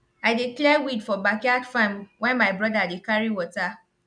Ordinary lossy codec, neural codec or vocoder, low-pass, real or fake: none; none; 9.9 kHz; real